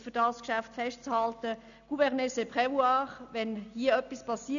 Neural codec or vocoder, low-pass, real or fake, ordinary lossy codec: none; 7.2 kHz; real; none